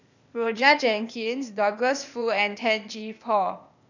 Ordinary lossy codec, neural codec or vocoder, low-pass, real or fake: none; codec, 16 kHz, 0.8 kbps, ZipCodec; 7.2 kHz; fake